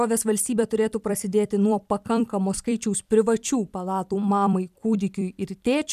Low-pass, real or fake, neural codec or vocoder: 14.4 kHz; fake; vocoder, 44.1 kHz, 128 mel bands every 256 samples, BigVGAN v2